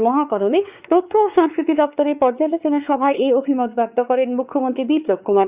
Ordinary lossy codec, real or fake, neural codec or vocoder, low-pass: Opus, 64 kbps; fake; codec, 16 kHz, 4 kbps, X-Codec, WavLM features, trained on Multilingual LibriSpeech; 3.6 kHz